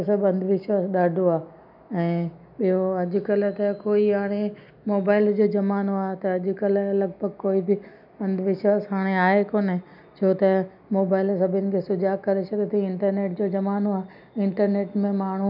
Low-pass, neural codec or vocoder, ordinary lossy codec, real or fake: 5.4 kHz; none; none; real